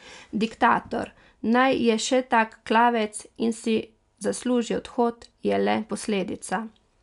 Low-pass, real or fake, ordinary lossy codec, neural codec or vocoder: 10.8 kHz; real; none; none